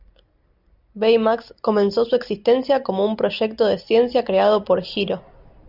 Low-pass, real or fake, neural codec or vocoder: 5.4 kHz; real; none